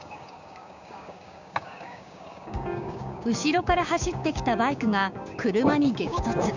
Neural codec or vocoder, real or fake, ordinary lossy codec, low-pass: codec, 16 kHz, 6 kbps, DAC; fake; none; 7.2 kHz